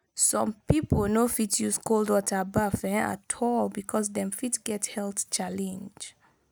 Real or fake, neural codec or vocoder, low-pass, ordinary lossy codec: real; none; none; none